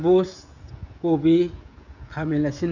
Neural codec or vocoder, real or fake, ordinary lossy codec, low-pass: codec, 16 kHz, 16 kbps, FreqCodec, smaller model; fake; none; 7.2 kHz